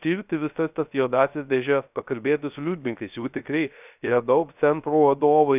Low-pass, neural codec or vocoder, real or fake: 3.6 kHz; codec, 16 kHz, 0.3 kbps, FocalCodec; fake